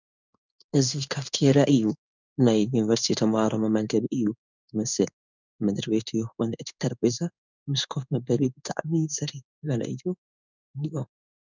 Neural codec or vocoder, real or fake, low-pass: codec, 16 kHz in and 24 kHz out, 1 kbps, XY-Tokenizer; fake; 7.2 kHz